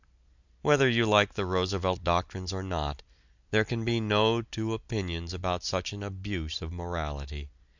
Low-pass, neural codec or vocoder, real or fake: 7.2 kHz; none; real